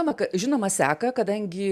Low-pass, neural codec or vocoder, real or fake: 14.4 kHz; none; real